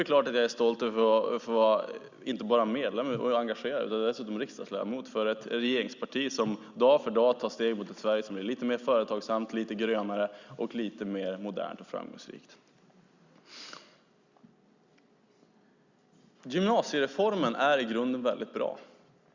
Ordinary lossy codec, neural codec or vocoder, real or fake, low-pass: Opus, 64 kbps; none; real; 7.2 kHz